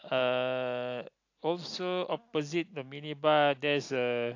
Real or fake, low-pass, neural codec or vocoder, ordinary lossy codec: real; 7.2 kHz; none; AAC, 48 kbps